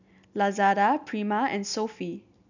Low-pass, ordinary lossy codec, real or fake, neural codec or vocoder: 7.2 kHz; none; real; none